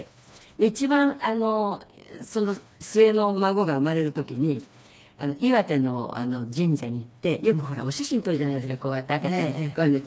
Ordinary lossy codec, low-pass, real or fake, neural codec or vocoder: none; none; fake; codec, 16 kHz, 2 kbps, FreqCodec, smaller model